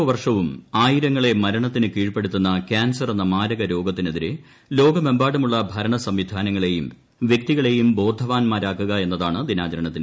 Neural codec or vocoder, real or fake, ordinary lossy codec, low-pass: none; real; none; none